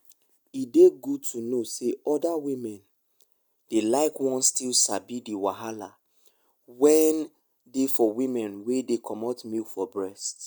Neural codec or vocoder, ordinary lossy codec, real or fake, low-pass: none; none; real; none